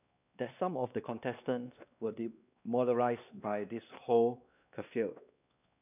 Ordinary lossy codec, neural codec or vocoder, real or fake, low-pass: none; codec, 16 kHz, 2 kbps, X-Codec, WavLM features, trained on Multilingual LibriSpeech; fake; 3.6 kHz